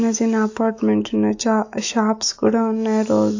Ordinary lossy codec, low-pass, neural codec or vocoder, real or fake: AAC, 48 kbps; 7.2 kHz; autoencoder, 48 kHz, 128 numbers a frame, DAC-VAE, trained on Japanese speech; fake